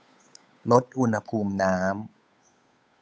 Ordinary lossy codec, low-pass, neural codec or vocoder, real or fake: none; none; codec, 16 kHz, 8 kbps, FunCodec, trained on Chinese and English, 25 frames a second; fake